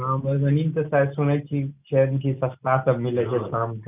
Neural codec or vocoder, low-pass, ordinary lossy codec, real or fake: none; 3.6 kHz; none; real